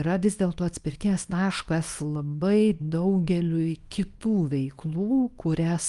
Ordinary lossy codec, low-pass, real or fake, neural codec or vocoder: Opus, 32 kbps; 10.8 kHz; fake; codec, 24 kHz, 0.9 kbps, WavTokenizer, small release